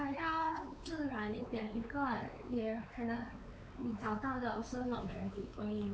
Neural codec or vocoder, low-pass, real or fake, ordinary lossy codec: codec, 16 kHz, 4 kbps, X-Codec, WavLM features, trained on Multilingual LibriSpeech; none; fake; none